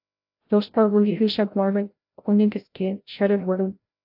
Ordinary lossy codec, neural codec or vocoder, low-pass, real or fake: AAC, 48 kbps; codec, 16 kHz, 0.5 kbps, FreqCodec, larger model; 5.4 kHz; fake